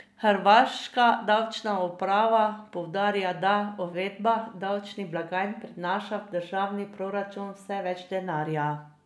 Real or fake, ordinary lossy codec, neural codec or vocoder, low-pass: real; none; none; none